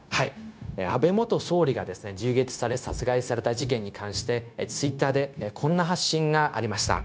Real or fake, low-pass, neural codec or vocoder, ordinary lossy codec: fake; none; codec, 16 kHz, 0.9 kbps, LongCat-Audio-Codec; none